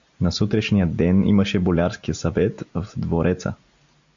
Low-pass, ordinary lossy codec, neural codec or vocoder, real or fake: 7.2 kHz; MP3, 64 kbps; none; real